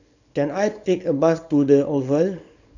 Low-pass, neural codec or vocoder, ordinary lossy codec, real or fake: 7.2 kHz; codec, 24 kHz, 0.9 kbps, WavTokenizer, small release; none; fake